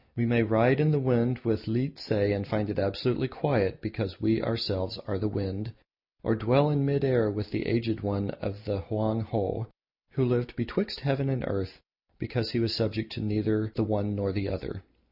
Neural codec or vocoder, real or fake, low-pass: none; real; 5.4 kHz